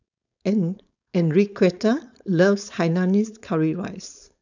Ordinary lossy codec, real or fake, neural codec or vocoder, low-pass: none; fake; codec, 16 kHz, 4.8 kbps, FACodec; 7.2 kHz